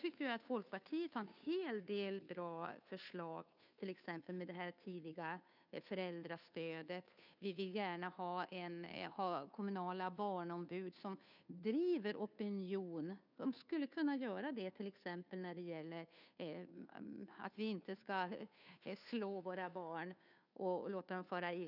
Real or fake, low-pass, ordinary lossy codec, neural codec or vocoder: fake; 5.4 kHz; none; codec, 16 kHz, 2 kbps, FunCodec, trained on Chinese and English, 25 frames a second